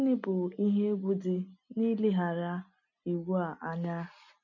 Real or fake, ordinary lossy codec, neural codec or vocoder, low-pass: real; none; none; 7.2 kHz